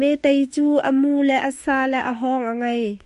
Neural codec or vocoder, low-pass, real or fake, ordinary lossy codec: autoencoder, 48 kHz, 32 numbers a frame, DAC-VAE, trained on Japanese speech; 14.4 kHz; fake; MP3, 48 kbps